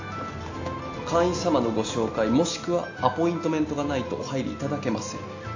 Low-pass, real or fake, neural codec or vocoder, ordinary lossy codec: 7.2 kHz; real; none; AAC, 48 kbps